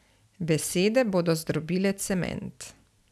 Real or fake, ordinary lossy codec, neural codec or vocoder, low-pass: real; none; none; none